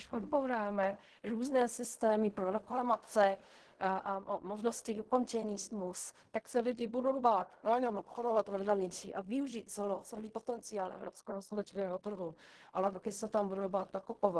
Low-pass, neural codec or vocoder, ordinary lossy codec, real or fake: 10.8 kHz; codec, 16 kHz in and 24 kHz out, 0.4 kbps, LongCat-Audio-Codec, fine tuned four codebook decoder; Opus, 16 kbps; fake